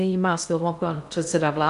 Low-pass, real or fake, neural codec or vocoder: 10.8 kHz; fake; codec, 16 kHz in and 24 kHz out, 0.6 kbps, FocalCodec, streaming, 2048 codes